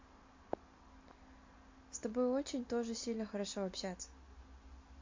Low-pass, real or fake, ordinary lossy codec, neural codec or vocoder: 7.2 kHz; real; MP3, 48 kbps; none